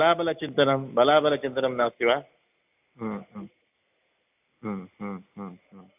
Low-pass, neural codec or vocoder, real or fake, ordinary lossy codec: 3.6 kHz; none; real; AAC, 32 kbps